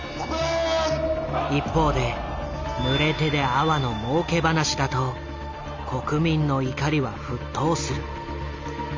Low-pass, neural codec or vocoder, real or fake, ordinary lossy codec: 7.2 kHz; none; real; MP3, 64 kbps